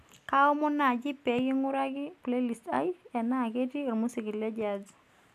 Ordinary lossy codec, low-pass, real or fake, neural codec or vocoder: AAC, 96 kbps; 14.4 kHz; real; none